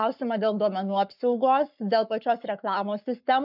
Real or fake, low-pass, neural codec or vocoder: fake; 5.4 kHz; codec, 44.1 kHz, 7.8 kbps, Pupu-Codec